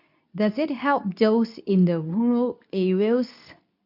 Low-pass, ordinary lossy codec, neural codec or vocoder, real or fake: 5.4 kHz; none; codec, 24 kHz, 0.9 kbps, WavTokenizer, medium speech release version 2; fake